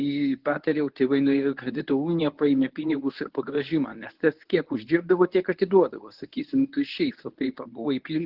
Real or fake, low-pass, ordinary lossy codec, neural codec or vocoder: fake; 5.4 kHz; Opus, 16 kbps; codec, 24 kHz, 0.9 kbps, WavTokenizer, medium speech release version 1